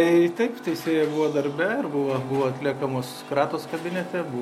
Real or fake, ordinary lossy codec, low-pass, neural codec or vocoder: real; MP3, 64 kbps; 19.8 kHz; none